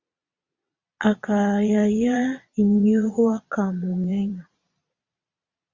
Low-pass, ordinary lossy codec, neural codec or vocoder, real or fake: 7.2 kHz; Opus, 64 kbps; vocoder, 22.05 kHz, 80 mel bands, Vocos; fake